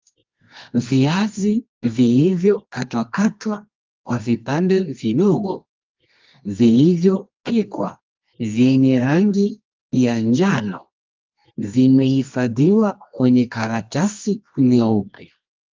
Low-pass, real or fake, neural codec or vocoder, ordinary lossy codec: 7.2 kHz; fake; codec, 24 kHz, 0.9 kbps, WavTokenizer, medium music audio release; Opus, 32 kbps